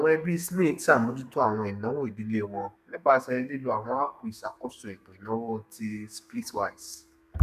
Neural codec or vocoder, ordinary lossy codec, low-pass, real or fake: codec, 32 kHz, 1.9 kbps, SNAC; none; 14.4 kHz; fake